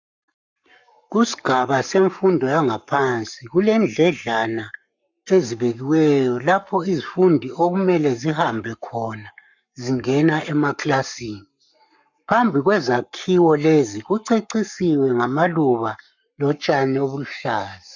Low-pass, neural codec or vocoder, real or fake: 7.2 kHz; codec, 44.1 kHz, 7.8 kbps, Pupu-Codec; fake